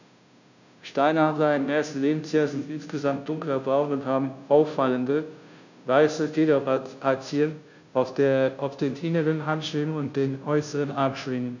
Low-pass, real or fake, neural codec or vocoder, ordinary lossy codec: 7.2 kHz; fake; codec, 16 kHz, 0.5 kbps, FunCodec, trained on Chinese and English, 25 frames a second; none